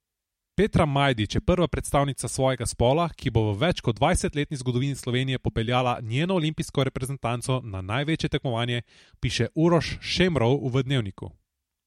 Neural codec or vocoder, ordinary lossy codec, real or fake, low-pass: none; MP3, 64 kbps; real; 19.8 kHz